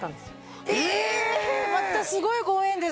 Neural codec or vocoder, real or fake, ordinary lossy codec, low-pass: none; real; none; none